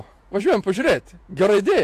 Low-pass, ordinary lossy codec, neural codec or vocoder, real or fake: 14.4 kHz; AAC, 64 kbps; vocoder, 48 kHz, 128 mel bands, Vocos; fake